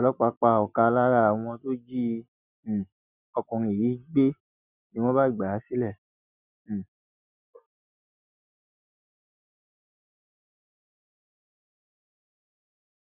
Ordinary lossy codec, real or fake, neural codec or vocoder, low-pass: none; real; none; 3.6 kHz